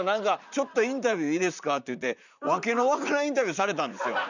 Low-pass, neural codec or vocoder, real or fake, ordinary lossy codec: 7.2 kHz; vocoder, 44.1 kHz, 128 mel bands, Pupu-Vocoder; fake; none